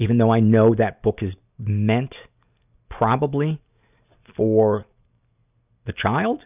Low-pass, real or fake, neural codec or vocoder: 3.6 kHz; real; none